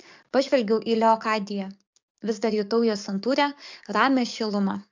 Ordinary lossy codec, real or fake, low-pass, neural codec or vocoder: MP3, 64 kbps; fake; 7.2 kHz; codec, 44.1 kHz, 7.8 kbps, DAC